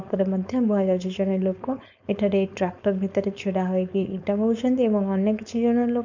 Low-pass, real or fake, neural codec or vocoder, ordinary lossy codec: 7.2 kHz; fake; codec, 16 kHz, 4.8 kbps, FACodec; none